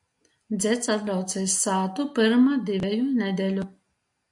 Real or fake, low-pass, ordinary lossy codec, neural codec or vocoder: real; 10.8 kHz; MP3, 64 kbps; none